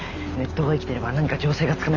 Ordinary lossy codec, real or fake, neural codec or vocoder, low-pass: MP3, 64 kbps; real; none; 7.2 kHz